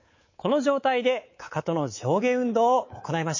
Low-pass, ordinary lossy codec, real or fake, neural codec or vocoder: 7.2 kHz; MP3, 32 kbps; fake; codec, 24 kHz, 3.1 kbps, DualCodec